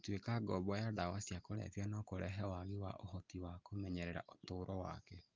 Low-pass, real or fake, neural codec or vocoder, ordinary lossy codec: 7.2 kHz; real; none; Opus, 24 kbps